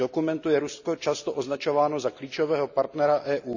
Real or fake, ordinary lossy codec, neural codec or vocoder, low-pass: real; none; none; 7.2 kHz